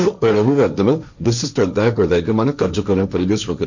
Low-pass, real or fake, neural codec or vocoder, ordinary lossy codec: 7.2 kHz; fake; codec, 16 kHz, 1.1 kbps, Voila-Tokenizer; none